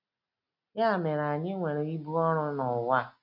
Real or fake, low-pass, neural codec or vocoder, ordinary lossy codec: real; 5.4 kHz; none; none